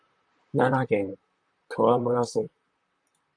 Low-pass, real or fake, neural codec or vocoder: 9.9 kHz; fake; vocoder, 44.1 kHz, 128 mel bands, Pupu-Vocoder